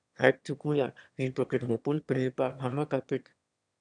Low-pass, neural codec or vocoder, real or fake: 9.9 kHz; autoencoder, 22.05 kHz, a latent of 192 numbers a frame, VITS, trained on one speaker; fake